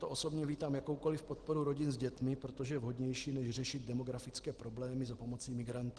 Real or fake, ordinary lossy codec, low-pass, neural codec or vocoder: real; Opus, 16 kbps; 10.8 kHz; none